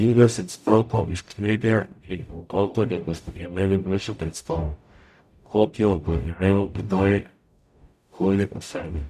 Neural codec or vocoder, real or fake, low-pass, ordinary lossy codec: codec, 44.1 kHz, 0.9 kbps, DAC; fake; 14.4 kHz; none